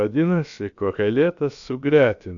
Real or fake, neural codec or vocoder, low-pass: fake; codec, 16 kHz, about 1 kbps, DyCAST, with the encoder's durations; 7.2 kHz